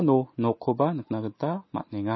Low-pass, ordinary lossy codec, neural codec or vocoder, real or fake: 7.2 kHz; MP3, 24 kbps; none; real